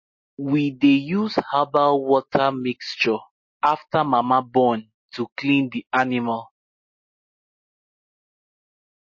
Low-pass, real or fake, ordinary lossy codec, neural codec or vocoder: 7.2 kHz; real; MP3, 32 kbps; none